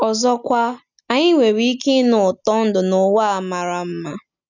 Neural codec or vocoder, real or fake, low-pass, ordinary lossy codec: none; real; 7.2 kHz; none